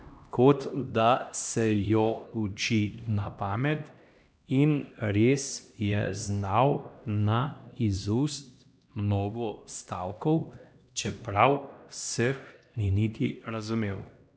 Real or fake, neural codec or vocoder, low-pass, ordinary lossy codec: fake; codec, 16 kHz, 1 kbps, X-Codec, HuBERT features, trained on LibriSpeech; none; none